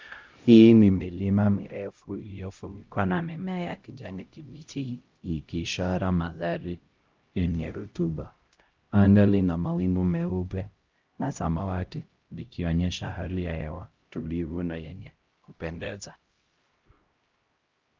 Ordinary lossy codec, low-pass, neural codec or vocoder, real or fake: Opus, 32 kbps; 7.2 kHz; codec, 16 kHz, 0.5 kbps, X-Codec, HuBERT features, trained on LibriSpeech; fake